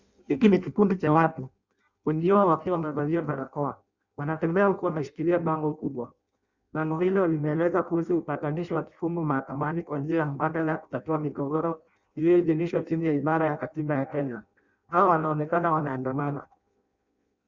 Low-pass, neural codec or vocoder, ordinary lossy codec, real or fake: 7.2 kHz; codec, 16 kHz in and 24 kHz out, 0.6 kbps, FireRedTTS-2 codec; Opus, 64 kbps; fake